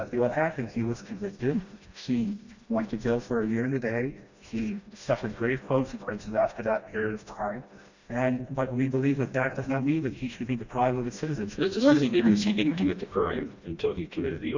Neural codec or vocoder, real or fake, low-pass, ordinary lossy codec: codec, 16 kHz, 1 kbps, FreqCodec, smaller model; fake; 7.2 kHz; Opus, 64 kbps